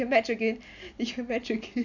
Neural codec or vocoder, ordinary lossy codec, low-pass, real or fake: none; none; 7.2 kHz; real